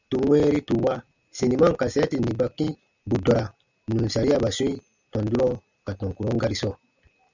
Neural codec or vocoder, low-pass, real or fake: none; 7.2 kHz; real